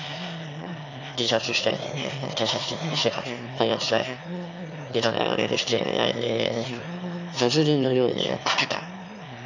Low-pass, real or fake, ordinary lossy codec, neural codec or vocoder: 7.2 kHz; fake; none; autoencoder, 22.05 kHz, a latent of 192 numbers a frame, VITS, trained on one speaker